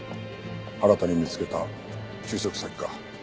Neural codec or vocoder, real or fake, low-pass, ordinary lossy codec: none; real; none; none